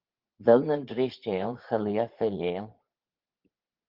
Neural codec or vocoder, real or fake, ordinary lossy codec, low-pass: vocoder, 44.1 kHz, 80 mel bands, Vocos; fake; Opus, 16 kbps; 5.4 kHz